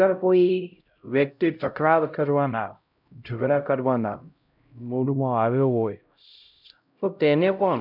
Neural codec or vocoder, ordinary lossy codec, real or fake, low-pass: codec, 16 kHz, 0.5 kbps, X-Codec, HuBERT features, trained on LibriSpeech; none; fake; 5.4 kHz